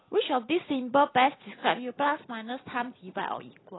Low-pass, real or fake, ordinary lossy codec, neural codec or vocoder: 7.2 kHz; real; AAC, 16 kbps; none